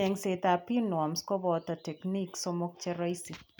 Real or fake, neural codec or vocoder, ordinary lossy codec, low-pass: real; none; none; none